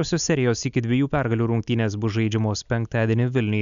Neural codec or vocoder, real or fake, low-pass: none; real; 7.2 kHz